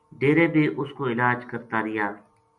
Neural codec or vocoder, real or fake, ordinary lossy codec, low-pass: none; real; MP3, 96 kbps; 10.8 kHz